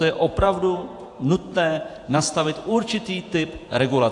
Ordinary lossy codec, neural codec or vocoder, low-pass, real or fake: AAC, 64 kbps; vocoder, 48 kHz, 128 mel bands, Vocos; 10.8 kHz; fake